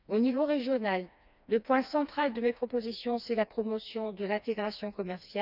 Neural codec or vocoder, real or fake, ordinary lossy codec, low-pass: codec, 16 kHz, 2 kbps, FreqCodec, smaller model; fake; none; 5.4 kHz